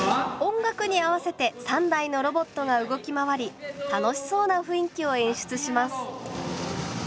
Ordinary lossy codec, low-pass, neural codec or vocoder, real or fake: none; none; none; real